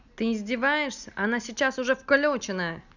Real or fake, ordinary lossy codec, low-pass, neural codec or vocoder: real; none; 7.2 kHz; none